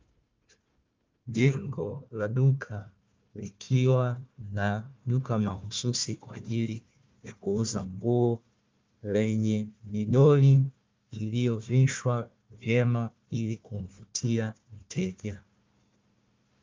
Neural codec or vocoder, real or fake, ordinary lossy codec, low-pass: codec, 16 kHz, 1 kbps, FunCodec, trained on Chinese and English, 50 frames a second; fake; Opus, 24 kbps; 7.2 kHz